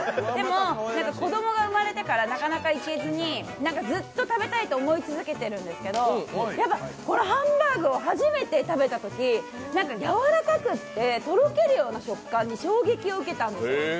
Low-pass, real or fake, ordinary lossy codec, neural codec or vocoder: none; real; none; none